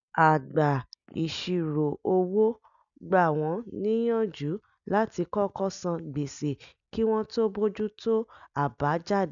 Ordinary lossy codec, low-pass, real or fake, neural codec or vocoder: none; 7.2 kHz; real; none